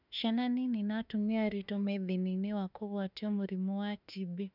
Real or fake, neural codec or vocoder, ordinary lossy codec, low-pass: fake; autoencoder, 48 kHz, 32 numbers a frame, DAC-VAE, trained on Japanese speech; none; 5.4 kHz